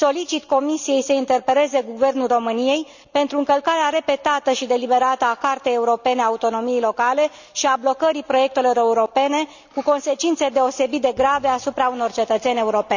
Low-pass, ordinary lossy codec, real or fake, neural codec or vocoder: 7.2 kHz; none; real; none